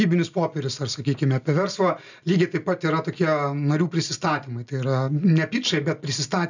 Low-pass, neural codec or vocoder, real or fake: 7.2 kHz; none; real